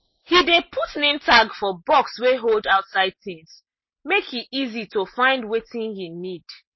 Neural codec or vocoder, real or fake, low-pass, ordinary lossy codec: none; real; 7.2 kHz; MP3, 24 kbps